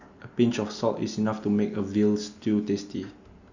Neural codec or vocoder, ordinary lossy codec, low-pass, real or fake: none; none; 7.2 kHz; real